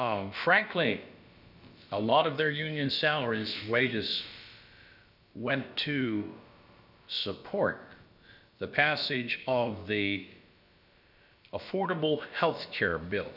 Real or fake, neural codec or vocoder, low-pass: fake; codec, 16 kHz, about 1 kbps, DyCAST, with the encoder's durations; 5.4 kHz